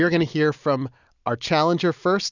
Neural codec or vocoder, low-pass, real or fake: none; 7.2 kHz; real